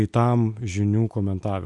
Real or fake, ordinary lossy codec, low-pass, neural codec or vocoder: real; MP3, 64 kbps; 10.8 kHz; none